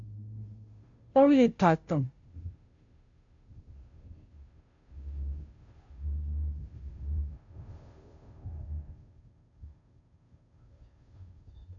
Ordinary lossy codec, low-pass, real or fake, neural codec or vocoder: MP3, 64 kbps; 7.2 kHz; fake; codec, 16 kHz, 0.5 kbps, FunCodec, trained on Chinese and English, 25 frames a second